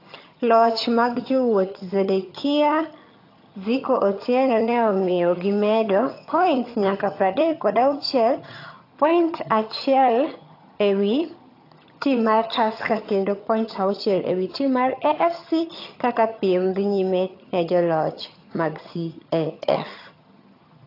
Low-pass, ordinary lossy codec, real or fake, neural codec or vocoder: 5.4 kHz; AAC, 32 kbps; fake; vocoder, 22.05 kHz, 80 mel bands, HiFi-GAN